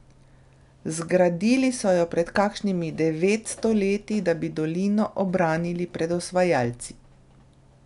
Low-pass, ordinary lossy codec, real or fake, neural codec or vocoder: 10.8 kHz; none; real; none